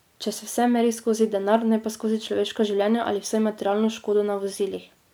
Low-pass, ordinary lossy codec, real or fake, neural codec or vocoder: none; none; real; none